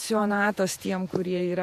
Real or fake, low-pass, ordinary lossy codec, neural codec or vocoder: fake; 14.4 kHz; MP3, 64 kbps; vocoder, 48 kHz, 128 mel bands, Vocos